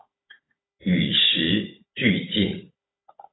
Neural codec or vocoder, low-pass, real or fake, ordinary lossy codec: codec, 16 kHz, 16 kbps, FunCodec, trained on Chinese and English, 50 frames a second; 7.2 kHz; fake; AAC, 16 kbps